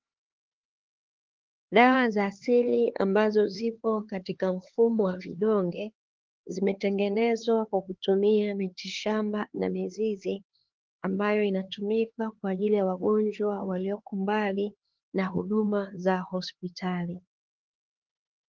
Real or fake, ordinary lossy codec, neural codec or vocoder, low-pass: fake; Opus, 16 kbps; codec, 16 kHz, 4 kbps, X-Codec, HuBERT features, trained on LibriSpeech; 7.2 kHz